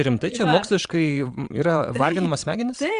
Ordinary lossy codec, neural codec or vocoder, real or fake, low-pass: Opus, 64 kbps; vocoder, 22.05 kHz, 80 mel bands, Vocos; fake; 9.9 kHz